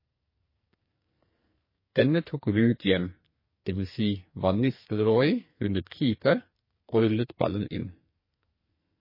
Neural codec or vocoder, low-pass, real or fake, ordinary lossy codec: codec, 44.1 kHz, 2.6 kbps, SNAC; 5.4 kHz; fake; MP3, 24 kbps